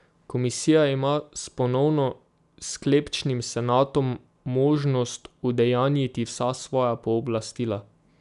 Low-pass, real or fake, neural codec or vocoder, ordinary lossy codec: 10.8 kHz; real; none; none